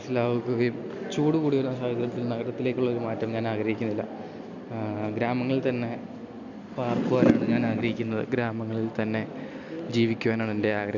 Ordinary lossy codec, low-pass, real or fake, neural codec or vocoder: Opus, 64 kbps; 7.2 kHz; real; none